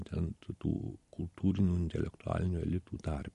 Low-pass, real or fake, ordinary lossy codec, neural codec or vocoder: 14.4 kHz; fake; MP3, 48 kbps; vocoder, 44.1 kHz, 128 mel bands every 256 samples, BigVGAN v2